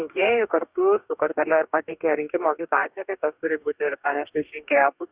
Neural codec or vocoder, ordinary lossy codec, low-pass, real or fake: codec, 44.1 kHz, 2.6 kbps, DAC; Opus, 64 kbps; 3.6 kHz; fake